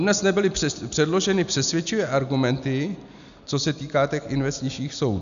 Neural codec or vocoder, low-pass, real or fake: none; 7.2 kHz; real